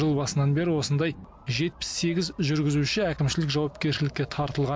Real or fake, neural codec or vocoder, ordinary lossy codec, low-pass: real; none; none; none